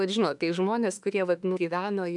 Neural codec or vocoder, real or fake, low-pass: autoencoder, 48 kHz, 32 numbers a frame, DAC-VAE, trained on Japanese speech; fake; 10.8 kHz